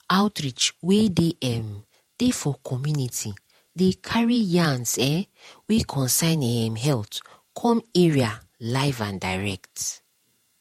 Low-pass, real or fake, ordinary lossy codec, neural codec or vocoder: 19.8 kHz; fake; MP3, 64 kbps; vocoder, 48 kHz, 128 mel bands, Vocos